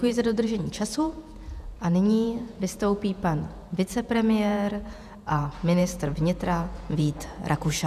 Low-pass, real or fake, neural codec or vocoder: 14.4 kHz; fake; vocoder, 48 kHz, 128 mel bands, Vocos